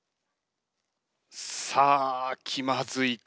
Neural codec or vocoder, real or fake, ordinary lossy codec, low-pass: none; real; none; none